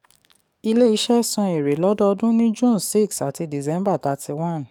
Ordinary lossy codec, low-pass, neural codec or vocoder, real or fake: none; 19.8 kHz; codec, 44.1 kHz, 7.8 kbps, DAC; fake